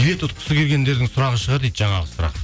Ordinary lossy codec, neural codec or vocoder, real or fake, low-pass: none; none; real; none